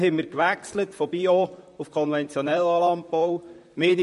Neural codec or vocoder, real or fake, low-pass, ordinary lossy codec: vocoder, 44.1 kHz, 128 mel bands, Pupu-Vocoder; fake; 14.4 kHz; MP3, 48 kbps